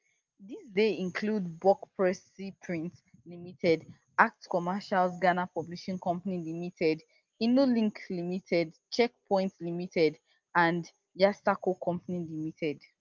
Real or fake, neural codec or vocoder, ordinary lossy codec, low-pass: real; none; Opus, 24 kbps; 7.2 kHz